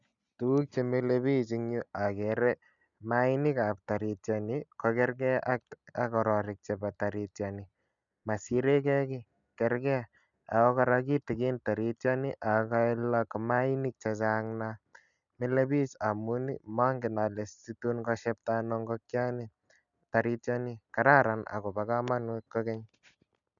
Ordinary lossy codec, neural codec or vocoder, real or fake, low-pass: none; none; real; 7.2 kHz